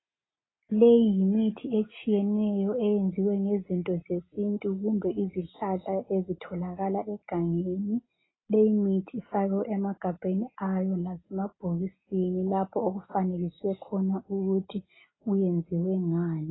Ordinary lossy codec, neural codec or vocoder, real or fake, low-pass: AAC, 16 kbps; none; real; 7.2 kHz